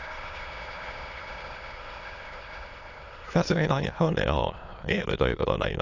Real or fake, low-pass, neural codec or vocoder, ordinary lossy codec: fake; 7.2 kHz; autoencoder, 22.05 kHz, a latent of 192 numbers a frame, VITS, trained on many speakers; AAC, 48 kbps